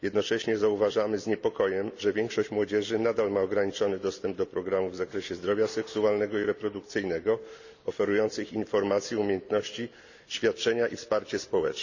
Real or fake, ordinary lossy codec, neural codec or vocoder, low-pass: real; none; none; 7.2 kHz